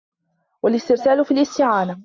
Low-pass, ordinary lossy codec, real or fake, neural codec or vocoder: 7.2 kHz; MP3, 64 kbps; real; none